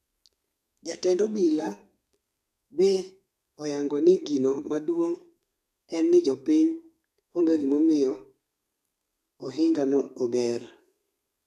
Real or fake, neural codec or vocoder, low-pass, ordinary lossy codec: fake; codec, 32 kHz, 1.9 kbps, SNAC; 14.4 kHz; none